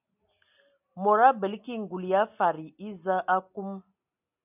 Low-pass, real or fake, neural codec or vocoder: 3.6 kHz; real; none